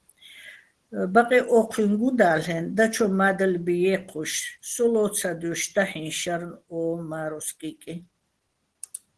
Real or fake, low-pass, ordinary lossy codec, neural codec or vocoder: real; 10.8 kHz; Opus, 16 kbps; none